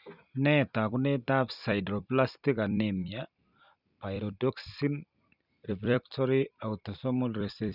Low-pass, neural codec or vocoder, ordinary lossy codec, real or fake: 5.4 kHz; vocoder, 44.1 kHz, 128 mel bands every 256 samples, BigVGAN v2; none; fake